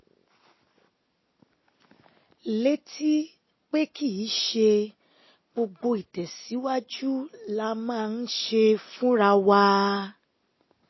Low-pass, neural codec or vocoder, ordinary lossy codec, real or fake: 7.2 kHz; none; MP3, 24 kbps; real